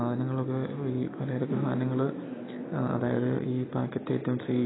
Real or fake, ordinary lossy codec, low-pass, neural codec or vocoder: fake; AAC, 16 kbps; 7.2 kHz; vocoder, 44.1 kHz, 128 mel bands every 256 samples, BigVGAN v2